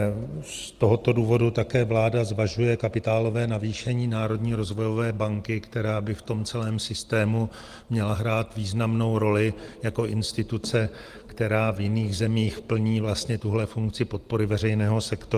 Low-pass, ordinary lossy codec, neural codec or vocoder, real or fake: 14.4 kHz; Opus, 24 kbps; none; real